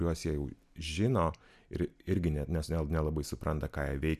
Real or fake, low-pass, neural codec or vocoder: real; 14.4 kHz; none